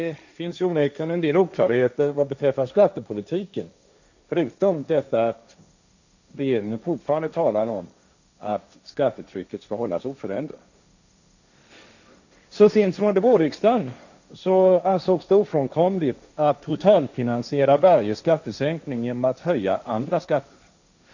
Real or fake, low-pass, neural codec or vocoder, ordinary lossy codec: fake; 7.2 kHz; codec, 16 kHz, 1.1 kbps, Voila-Tokenizer; none